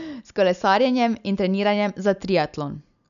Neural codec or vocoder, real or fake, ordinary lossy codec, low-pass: none; real; none; 7.2 kHz